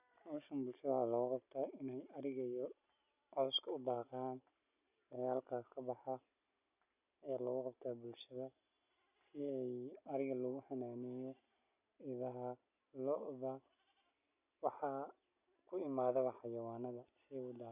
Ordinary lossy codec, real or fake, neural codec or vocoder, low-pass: none; real; none; 3.6 kHz